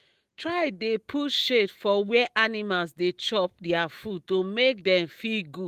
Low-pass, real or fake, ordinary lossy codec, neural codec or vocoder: 14.4 kHz; fake; Opus, 24 kbps; autoencoder, 48 kHz, 128 numbers a frame, DAC-VAE, trained on Japanese speech